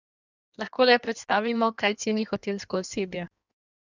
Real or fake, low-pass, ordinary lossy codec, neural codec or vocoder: fake; 7.2 kHz; none; codec, 16 kHz in and 24 kHz out, 1.1 kbps, FireRedTTS-2 codec